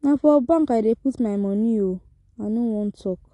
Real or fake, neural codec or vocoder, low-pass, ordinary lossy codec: real; none; 10.8 kHz; AAC, 48 kbps